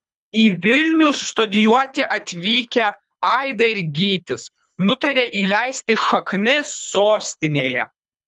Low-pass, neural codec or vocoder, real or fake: 10.8 kHz; codec, 24 kHz, 3 kbps, HILCodec; fake